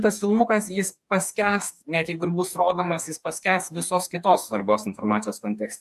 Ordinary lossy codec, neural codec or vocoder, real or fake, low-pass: AAC, 96 kbps; codec, 44.1 kHz, 2.6 kbps, DAC; fake; 14.4 kHz